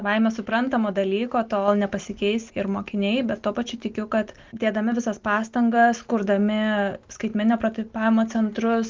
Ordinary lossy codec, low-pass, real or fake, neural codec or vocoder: Opus, 32 kbps; 7.2 kHz; real; none